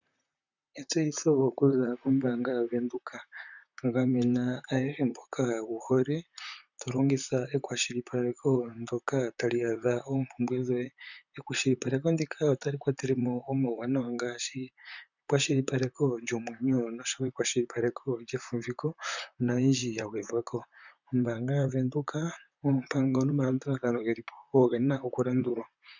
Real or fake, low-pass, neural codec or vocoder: fake; 7.2 kHz; vocoder, 44.1 kHz, 128 mel bands, Pupu-Vocoder